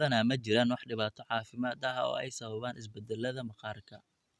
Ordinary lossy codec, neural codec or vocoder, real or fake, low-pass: none; none; real; none